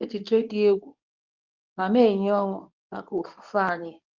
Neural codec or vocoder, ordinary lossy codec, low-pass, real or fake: codec, 24 kHz, 0.9 kbps, WavTokenizer, medium speech release version 2; Opus, 32 kbps; 7.2 kHz; fake